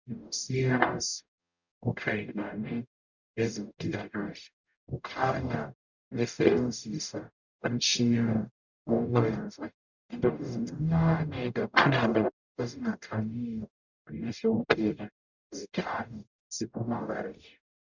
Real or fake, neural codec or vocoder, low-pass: fake; codec, 44.1 kHz, 0.9 kbps, DAC; 7.2 kHz